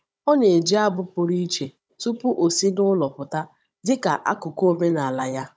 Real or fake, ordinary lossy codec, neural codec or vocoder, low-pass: fake; none; codec, 16 kHz, 16 kbps, FunCodec, trained on Chinese and English, 50 frames a second; none